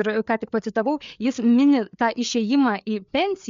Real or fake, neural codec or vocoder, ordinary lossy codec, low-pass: fake; codec, 16 kHz, 4 kbps, FreqCodec, larger model; MP3, 64 kbps; 7.2 kHz